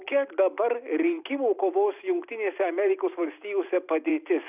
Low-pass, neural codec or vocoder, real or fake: 3.6 kHz; vocoder, 44.1 kHz, 128 mel bands every 256 samples, BigVGAN v2; fake